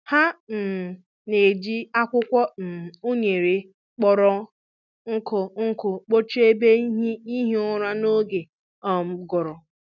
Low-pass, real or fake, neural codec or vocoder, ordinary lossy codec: 7.2 kHz; real; none; none